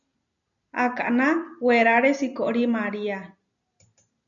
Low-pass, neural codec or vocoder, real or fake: 7.2 kHz; none; real